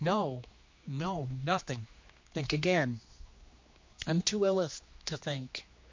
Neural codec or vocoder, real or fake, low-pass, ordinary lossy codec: codec, 16 kHz, 2 kbps, X-Codec, HuBERT features, trained on general audio; fake; 7.2 kHz; MP3, 48 kbps